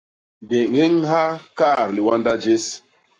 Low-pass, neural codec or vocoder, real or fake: 9.9 kHz; codec, 44.1 kHz, 7.8 kbps, Pupu-Codec; fake